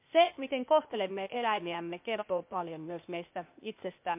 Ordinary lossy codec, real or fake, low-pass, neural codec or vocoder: MP3, 32 kbps; fake; 3.6 kHz; codec, 16 kHz, 0.8 kbps, ZipCodec